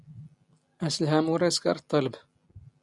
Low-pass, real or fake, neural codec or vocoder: 10.8 kHz; real; none